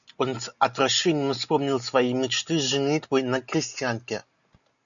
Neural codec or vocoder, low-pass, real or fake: none; 7.2 kHz; real